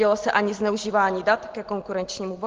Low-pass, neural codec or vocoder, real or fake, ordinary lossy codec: 7.2 kHz; none; real; Opus, 16 kbps